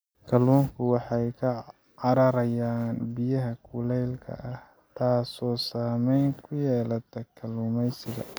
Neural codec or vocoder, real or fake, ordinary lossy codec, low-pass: none; real; none; none